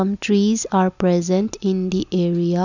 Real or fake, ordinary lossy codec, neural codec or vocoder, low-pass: real; none; none; 7.2 kHz